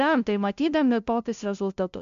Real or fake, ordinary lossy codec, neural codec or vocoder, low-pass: fake; MP3, 64 kbps; codec, 16 kHz, 0.5 kbps, FunCodec, trained on LibriTTS, 25 frames a second; 7.2 kHz